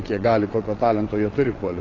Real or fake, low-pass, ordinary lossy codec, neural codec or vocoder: fake; 7.2 kHz; AAC, 32 kbps; vocoder, 22.05 kHz, 80 mel bands, WaveNeXt